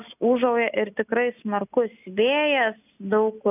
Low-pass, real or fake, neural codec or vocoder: 3.6 kHz; real; none